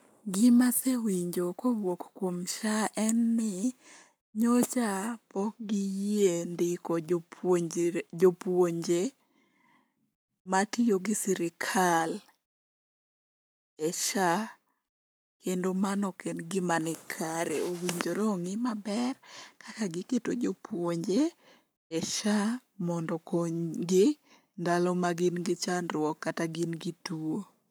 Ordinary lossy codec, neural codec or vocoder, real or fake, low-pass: none; codec, 44.1 kHz, 7.8 kbps, Pupu-Codec; fake; none